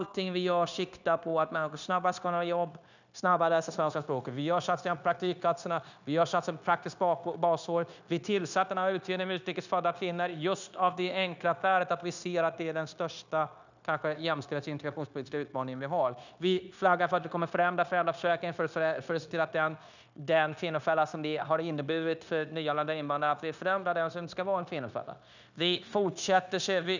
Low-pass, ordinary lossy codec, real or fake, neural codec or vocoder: 7.2 kHz; none; fake; codec, 16 kHz, 0.9 kbps, LongCat-Audio-Codec